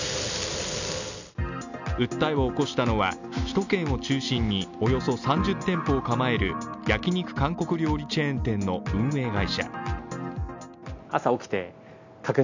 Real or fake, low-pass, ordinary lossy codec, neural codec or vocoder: real; 7.2 kHz; none; none